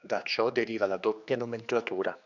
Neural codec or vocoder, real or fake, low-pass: codec, 16 kHz, 2 kbps, X-Codec, HuBERT features, trained on general audio; fake; 7.2 kHz